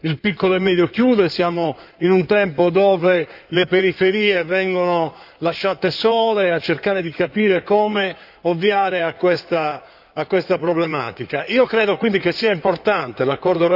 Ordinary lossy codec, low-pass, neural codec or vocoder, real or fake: none; 5.4 kHz; codec, 16 kHz in and 24 kHz out, 2.2 kbps, FireRedTTS-2 codec; fake